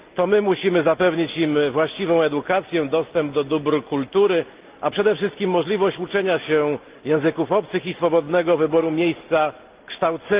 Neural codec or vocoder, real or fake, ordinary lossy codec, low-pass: none; real; Opus, 32 kbps; 3.6 kHz